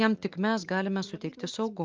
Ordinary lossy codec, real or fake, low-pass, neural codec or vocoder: Opus, 24 kbps; real; 7.2 kHz; none